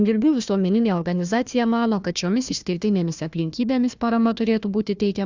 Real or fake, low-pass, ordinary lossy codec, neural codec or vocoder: fake; 7.2 kHz; Opus, 64 kbps; codec, 16 kHz, 1 kbps, FunCodec, trained on Chinese and English, 50 frames a second